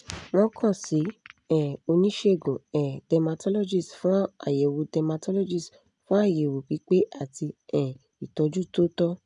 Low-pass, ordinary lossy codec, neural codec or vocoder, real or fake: 10.8 kHz; none; none; real